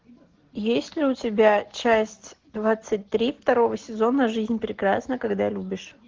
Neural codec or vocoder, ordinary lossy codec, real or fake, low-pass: none; Opus, 16 kbps; real; 7.2 kHz